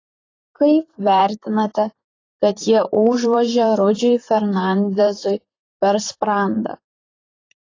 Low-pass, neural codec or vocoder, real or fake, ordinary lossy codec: 7.2 kHz; vocoder, 44.1 kHz, 128 mel bands, Pupu-Vocoder; fake; AAC, 32 kbps